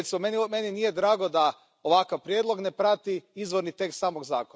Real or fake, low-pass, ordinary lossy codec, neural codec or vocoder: real; none; none; none